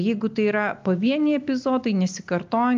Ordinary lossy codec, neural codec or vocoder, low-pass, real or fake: Opus, 24 kbps; none; 7.2 kHz; real